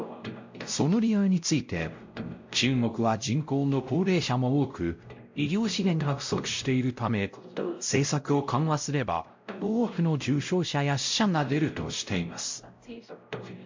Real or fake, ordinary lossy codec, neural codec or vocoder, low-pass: fake; MP3, 48 kbps; codec, 16 kHz, 0.5 kbps, X-Codec, WavLM features, trained on Multilingual LibriSpeech; 7.2 kHz